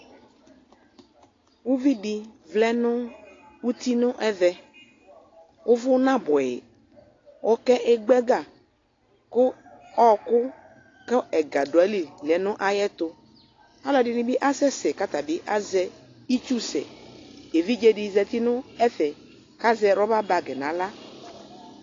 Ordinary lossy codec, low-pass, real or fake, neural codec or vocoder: AAC, 32 kbps; 7.2 kHz; real; none